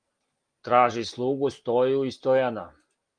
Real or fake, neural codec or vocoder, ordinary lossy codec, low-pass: real; none; Opus, 32 kbps; 9.9 kHz